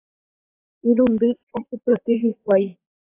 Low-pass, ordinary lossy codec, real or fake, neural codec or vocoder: 3.6 kHz; AAC, 24 kbps; fake; vocoder, 44.1 kHz, 128 mel bands, Pupu-Vocoder